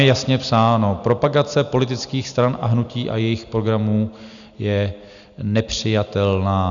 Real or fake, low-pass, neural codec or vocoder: real; 7.2 kHz; none